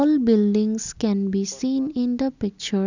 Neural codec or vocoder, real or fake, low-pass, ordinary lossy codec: none; real; 7.2 kHz; none